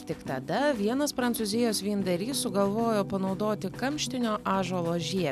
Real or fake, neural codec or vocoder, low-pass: real; none; 14.4 kHz